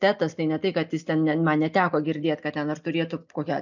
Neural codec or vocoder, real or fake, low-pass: none; real; 7.2 kHz